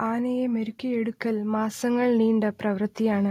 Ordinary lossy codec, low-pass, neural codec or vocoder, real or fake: AAC, 48 kbps; 19.8 kHz; none; real